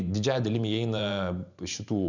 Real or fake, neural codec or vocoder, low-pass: fake; vocoder, 44.1 kHz, 128 mel bands every 512 samples, BigVGAN v2; 7.2 kHz